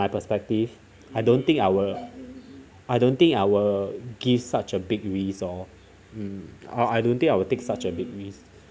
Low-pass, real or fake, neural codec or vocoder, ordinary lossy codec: none; real; none; none